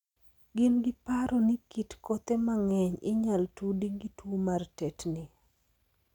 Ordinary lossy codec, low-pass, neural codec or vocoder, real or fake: none; 19.8 kHz; none; real